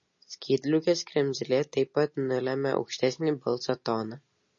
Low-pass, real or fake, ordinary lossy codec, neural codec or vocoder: 7.2 kHz; real; MP3, 32 kbps; none